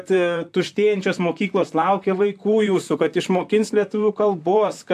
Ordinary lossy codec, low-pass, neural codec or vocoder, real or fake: AAC, 96 kbps; 14.4 kHz; vocoder, 44.1 kHz, 128 mel bands, Pupu-Vocoder; fake